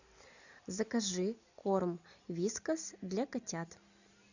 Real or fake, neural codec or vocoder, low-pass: real; none; 7.2 kHz